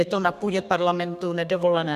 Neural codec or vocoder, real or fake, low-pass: codec, 32 kHz, 1.9 kbps, SNAC; fake; 14.4 kHz